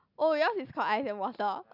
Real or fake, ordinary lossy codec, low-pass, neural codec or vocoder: real; none; 5.4 kHz; none